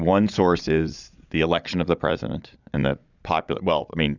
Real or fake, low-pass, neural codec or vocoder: fake; 7.2 kHz; vocoder, 22.05 kHz, 80 mel bands, Vocos